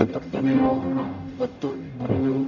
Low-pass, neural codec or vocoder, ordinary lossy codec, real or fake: 7.2 kHz; codec, 44.1 kHz, 0.9 kbps, DAC; none; fake